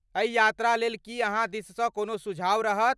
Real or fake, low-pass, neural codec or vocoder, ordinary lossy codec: real; none; none; none